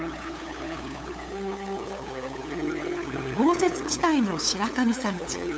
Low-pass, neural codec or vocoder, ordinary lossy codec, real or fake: none; codec, 16 kHz, 8 kbps, FunCodec, trained on LibriTTS, 25 frames a second; none; fake